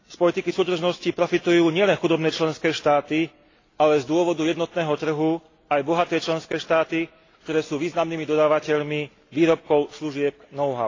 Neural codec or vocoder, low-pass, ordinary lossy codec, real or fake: none; 7.2 kHz; AAC, 32 kbps; real